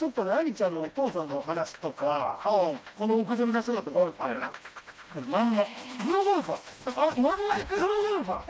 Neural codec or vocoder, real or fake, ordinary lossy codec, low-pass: codec, 16 kHz, 1 kbps, FreqCodec, smaller model; fake; none; none